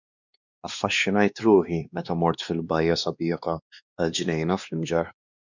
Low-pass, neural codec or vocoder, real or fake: 7.2 kHz; codec, 16 kHz, 2 kbps, X-Codec, WavLM features, trained on Multilingual LibriSpeech; fake